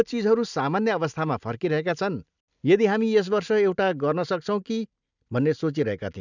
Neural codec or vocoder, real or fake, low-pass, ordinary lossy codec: none; real; 7.2 kHz; none